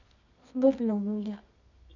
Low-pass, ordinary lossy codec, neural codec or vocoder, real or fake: 7.2 kHz; none; codec, 24 kHz, 0.9 kbps, WavTokenizer, medium music audio release; fake